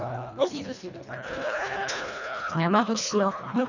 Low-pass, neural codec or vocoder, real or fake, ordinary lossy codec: 7.2 kHz; codec, 24 kHz, 1.5 kbps, HILCodec; fake; none